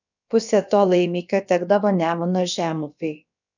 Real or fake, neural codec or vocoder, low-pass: fake; codec, 16 kHz, about 1 kbps, DyCAST, with the encoder's durations; 7.2 kHz